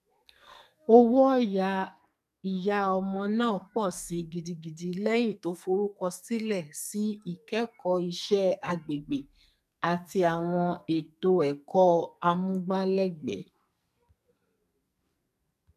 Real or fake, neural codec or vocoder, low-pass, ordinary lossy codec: fake; codec, 44.1 kHz, 2.6 kbps, SNAC; 14.4 kHz; none